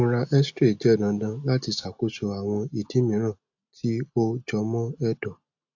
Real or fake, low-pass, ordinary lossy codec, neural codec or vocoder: real; 7.2 kHz; AAC, 48 kbps; none